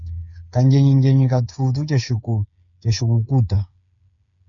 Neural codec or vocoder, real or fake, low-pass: codec, 16 kHz, 8 kbps, FreqCodec, smaller model; fake; 7.2 kHz